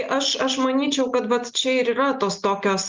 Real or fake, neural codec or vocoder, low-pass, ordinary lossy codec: real; none; 7.2 kHz; Opus, 32 kbps